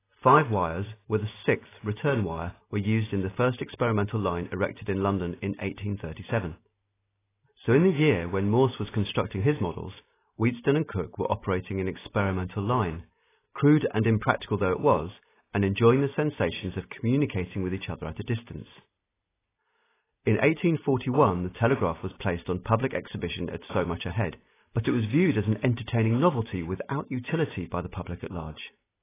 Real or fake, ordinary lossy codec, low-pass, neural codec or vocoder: real; AAC, 16 kbps; 3.6 kHz; none